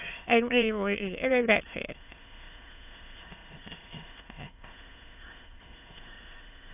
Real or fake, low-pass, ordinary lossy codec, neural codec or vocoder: fake; 3.6 kHz; AAC, 32 kbps; autoencoder, 22.05 kHz, a latent of 192 numbers a frame, VITS, trained on many speakers